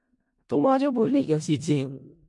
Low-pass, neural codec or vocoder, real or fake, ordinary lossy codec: 10.8 kHz; codec, 16 kHz in and 24 kHz out, 0.4 kbps, LongCat-Audio-Codec, four codebook decoder; fake; MP3, 48 kbps